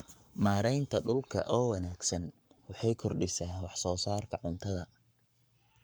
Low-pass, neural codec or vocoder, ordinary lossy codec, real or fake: none; codec, 44.1 kHz, 7.8 kbps, Pupu-Codec; none; fake